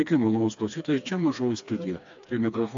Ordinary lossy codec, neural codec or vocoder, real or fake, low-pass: AAC, 64 kbps; codec, 16 kHz, 2 kbps, FreqCodec, smaller model; fake; 7.2 kHz